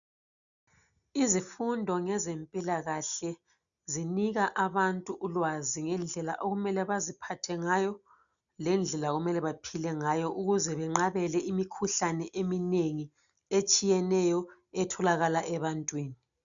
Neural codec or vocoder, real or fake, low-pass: none; real; 7.2 kHz